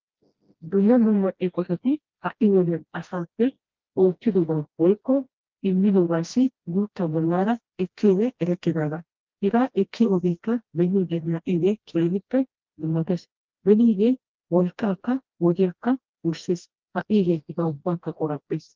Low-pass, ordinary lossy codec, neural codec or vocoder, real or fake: 7.2 kHz; Opus, 32 kbps; codec, 16 kHz, 1 kbps, FreqCodec, smaller model; fake